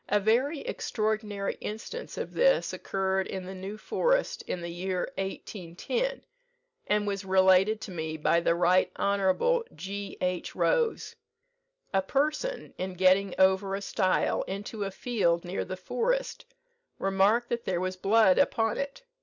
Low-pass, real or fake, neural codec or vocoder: 7.2 kHz; real; none